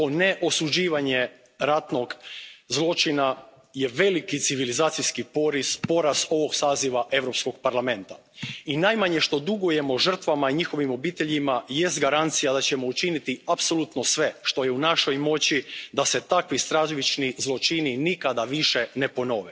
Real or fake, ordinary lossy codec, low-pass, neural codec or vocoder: real; none; none; none